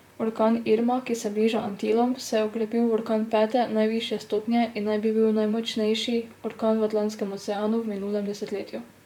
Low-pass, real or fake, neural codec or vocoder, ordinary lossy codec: 19.8 kHz; fake; vocoder, 44.1 kHz, 128 mel bands, Pupu-Vocoder; none